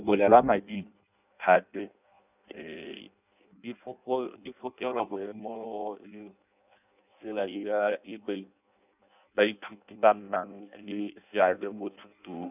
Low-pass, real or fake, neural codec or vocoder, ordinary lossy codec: 3.6 kHz; fake; codec, 16 kHz in and 24 kHz out, 0.6 kbps, FireRedTTS-2 codec; none